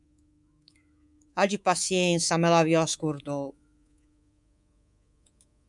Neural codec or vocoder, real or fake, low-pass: codec, 24 kHz, 3.1 kbps, DualCodec; fake; 10.8 kHz